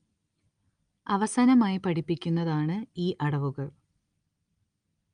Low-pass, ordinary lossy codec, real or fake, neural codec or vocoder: 9.9 kHz; Opus, 32 kbps; real; none